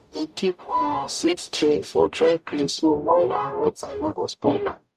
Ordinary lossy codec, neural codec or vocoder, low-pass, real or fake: none; codec, 44.1 kHz, 0.9 kbps, DAC; 14.4 kHz; fake